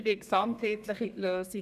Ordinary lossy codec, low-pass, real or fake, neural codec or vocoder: none; 14.4 kHz; fake; codec, 32 kHz, 1.9 kbps, SNAC